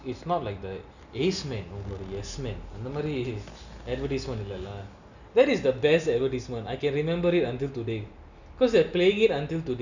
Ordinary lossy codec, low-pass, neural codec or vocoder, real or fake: none; 7.2 kHz; none; real